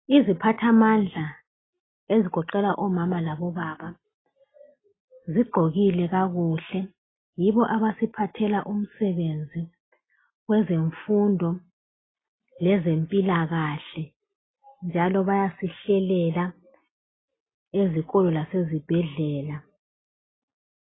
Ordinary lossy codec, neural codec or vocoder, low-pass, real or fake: AAC, 16 kbps; none; 7.2 kHz; real